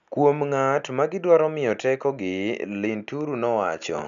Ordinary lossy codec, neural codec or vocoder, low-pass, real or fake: none; none; 7.2 kHz; real